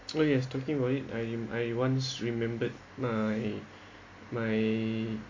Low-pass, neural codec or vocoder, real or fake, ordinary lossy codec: 7.2 kHz; none; real; MP3, 48 kbps